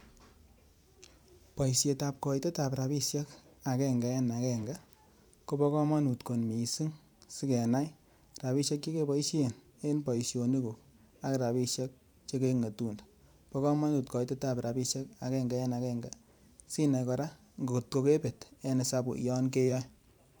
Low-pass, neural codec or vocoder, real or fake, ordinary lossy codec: none; vocoder, 44.1 kHz, 128 mel bands every 512 samples, BigVGAN v2; fake; none